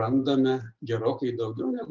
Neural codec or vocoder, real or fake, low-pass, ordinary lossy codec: none; real; 7.2 kHz; Opus, 24 kbps